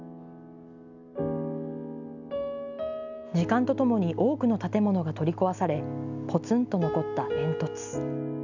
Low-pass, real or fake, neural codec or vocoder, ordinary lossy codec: 7.2 kHz; real; none; none